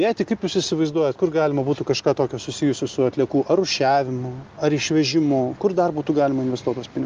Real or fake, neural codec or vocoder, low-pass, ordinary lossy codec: real; none; 7.2 kHz; Opus, 32 kbps